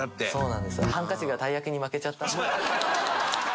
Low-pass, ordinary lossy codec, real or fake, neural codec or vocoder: none; none; real; none